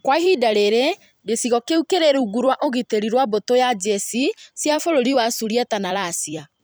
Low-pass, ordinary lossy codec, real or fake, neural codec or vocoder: none; none; fake; vocoder, 44.1 kHz, 128 mel bands every 256 samples, BigVGAN v2